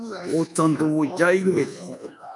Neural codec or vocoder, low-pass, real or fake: codec, 24 kHz, 1.2 kbps, DualCodec; 10.8 kHz; fake